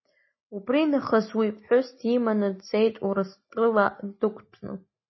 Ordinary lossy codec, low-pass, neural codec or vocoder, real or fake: MP3, 24 kbps; 7.2 kHz; none; real